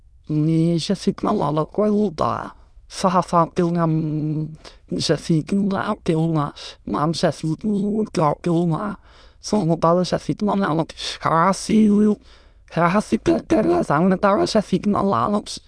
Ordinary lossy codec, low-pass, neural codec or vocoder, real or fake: none; none; autoencoder, 22.05 kHz, a latent of 192 numbers a frame, VITS, trained on many speakers; fake